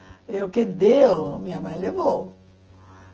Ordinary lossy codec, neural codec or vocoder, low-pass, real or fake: Opus, 16 kbps; vocoder, 24 kHz, 100 mel bands, Vocos; 7.2 kHz; fake